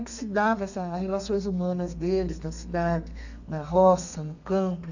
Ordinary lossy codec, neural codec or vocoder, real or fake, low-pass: none; codec, 32 kHz, 1.9 kbps, SNAC; fake; 7.2 kHz